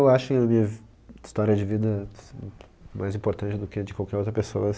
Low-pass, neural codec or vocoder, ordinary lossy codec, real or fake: none; none; none; real